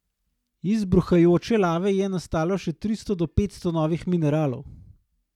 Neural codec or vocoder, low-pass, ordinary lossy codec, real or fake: none; 19.8 kHz; none; real